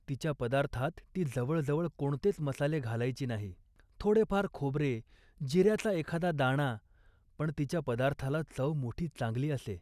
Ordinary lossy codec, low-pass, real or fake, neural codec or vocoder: none; 14.4 kHz; real; none